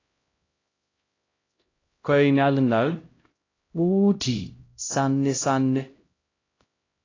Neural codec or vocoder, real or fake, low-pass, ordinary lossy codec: codec, 16 kHz, 0.5 kbps, X-Codec, HuBERT features, trained on LibriSpeech; fake; 7.2 kHz; AAC, 32 kbps